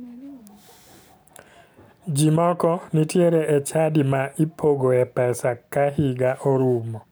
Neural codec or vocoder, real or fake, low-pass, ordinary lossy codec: none; real; none; none